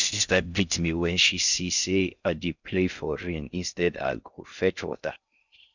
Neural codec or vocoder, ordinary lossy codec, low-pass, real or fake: codec, 16 kHz in and 24 kHz out, 0.6 kbps, FocalCodec, streaming, 4096 codes; none; 7.2 kHz; fake